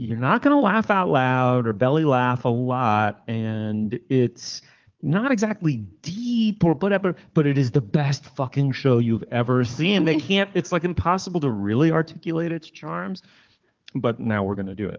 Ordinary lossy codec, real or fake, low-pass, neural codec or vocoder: Opus, 32 kbps; fake; 7.2 kHz; codec, 16 kHz, 4 kbps, FunCodec, trained on Chinese and English, 50 frames a second